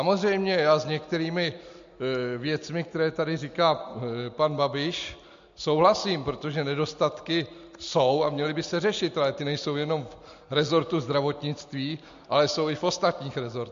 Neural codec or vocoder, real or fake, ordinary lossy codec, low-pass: none; real; MP3, 48 kbps; 7.2 kHz